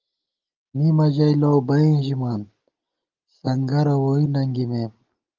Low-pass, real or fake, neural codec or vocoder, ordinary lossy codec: 7.2 kHz; real; none; Opus, 32 kbps